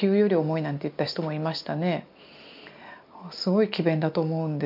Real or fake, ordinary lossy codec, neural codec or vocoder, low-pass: real; MP3, 48 kbps; none; 5.4 kHz